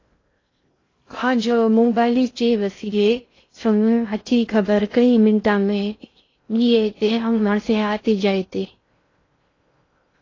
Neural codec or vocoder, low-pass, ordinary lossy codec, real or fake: codec, 16 kHz in and 24 kHz out, 0.6 kbps, FocalCodec, streaming, 2048 codes; 7.2 kHz; AAC, 32 kbps; fake